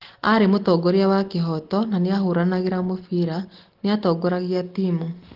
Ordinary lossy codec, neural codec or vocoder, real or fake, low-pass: Opus, 16 kbps; none; real; 5.4 kHz